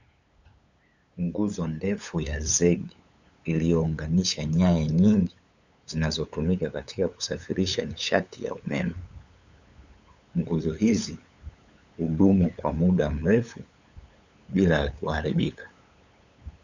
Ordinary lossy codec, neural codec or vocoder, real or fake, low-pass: Opus, 64 kbps; codec, 16 kHz, 8 kbps, FunCodec, trained on LibriTTS, 25 frames a second; fake; 7.2 kHz